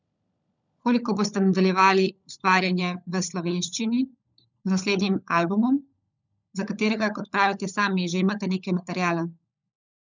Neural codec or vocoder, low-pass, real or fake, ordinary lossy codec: codec, 16 kHz, 16 kbps, FunCodec, trained on LibriTTS, 50 frames a second; 7.2 kHz; fake; none